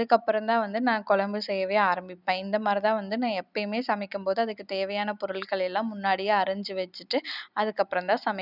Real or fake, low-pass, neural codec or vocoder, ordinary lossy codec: real; 5.4 kHz; none; none